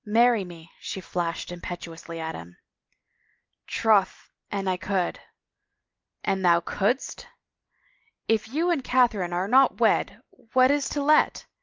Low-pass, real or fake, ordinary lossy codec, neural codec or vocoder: 7.2 kHz; real; Opus, 24 kbps; none